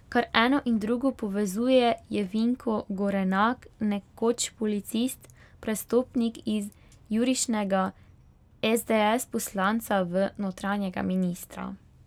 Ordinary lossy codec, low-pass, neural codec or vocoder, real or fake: none; 19.8 kHz; none; real